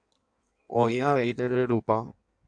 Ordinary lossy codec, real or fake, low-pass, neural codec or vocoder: AAC, 64 kbps; fake; 9.9 kHz; codec, 16 kHz in and 24 kHz out, 1.1 kbps, FireRedTTS-2 codec